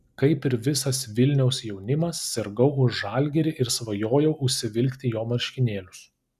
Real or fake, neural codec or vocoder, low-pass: real; none; 14.4 kHz